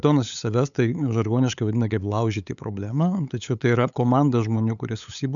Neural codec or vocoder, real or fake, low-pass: codec, 16 kHz, 8 kbps, FunCodec, trained on LibriTTS, 25 frames a second; fake; 7.2 kHz